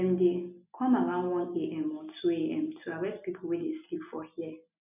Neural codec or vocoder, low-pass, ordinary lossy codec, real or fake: none; 3.6 kHz; none; real